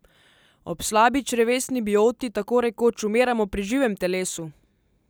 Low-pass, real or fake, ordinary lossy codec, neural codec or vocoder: none; real; none; none